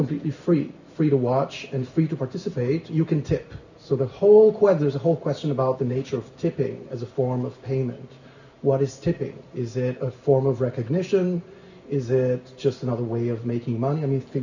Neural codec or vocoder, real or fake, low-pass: none; real; 7.2 kHz